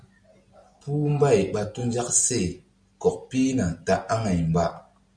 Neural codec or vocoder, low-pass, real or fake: none; 9.9 kHz; real